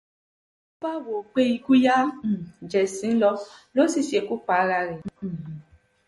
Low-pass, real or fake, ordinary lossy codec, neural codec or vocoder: 19.8 kHz; real; MP3, 48 kbps; none